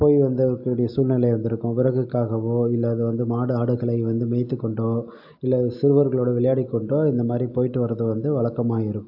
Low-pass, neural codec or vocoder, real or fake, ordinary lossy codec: 5.4 kHz; none; real; AAC, 48 kbps